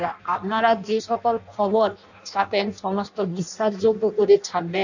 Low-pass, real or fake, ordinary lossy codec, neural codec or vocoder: 7.2 kHz; fake; none; codec, 16 kHz in and 24 kHz out, 0.6 kbps, FireRedTTS-2 codec